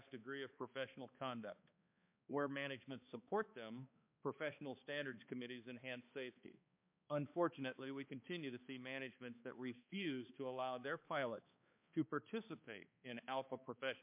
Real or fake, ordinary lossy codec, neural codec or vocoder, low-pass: fake; MP3, 32 kbps; codec, 16 kHz, 2 kbps, X-Codec, HuBERT features, trained on balanced general audio; 3.6 kHz